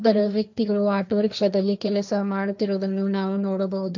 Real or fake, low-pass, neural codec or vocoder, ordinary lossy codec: fake; 7.2 kHz; codec, 16 kHz, 1.1 kbps, Voila-Tokenizer; none